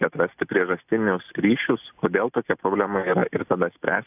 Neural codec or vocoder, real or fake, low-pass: none; real; 3.6 kHz